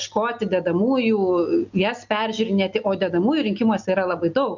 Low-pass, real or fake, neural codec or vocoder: 7.2 kHz; real; none